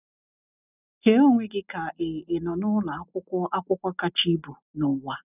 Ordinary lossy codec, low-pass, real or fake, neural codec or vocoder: none; 3.6 kHz; real; none